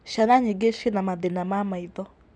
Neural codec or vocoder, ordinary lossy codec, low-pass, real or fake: none; none; none; real